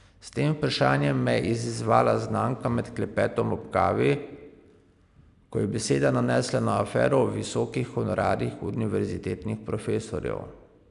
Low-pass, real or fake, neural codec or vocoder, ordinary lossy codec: 10.8 kHz; real; none; none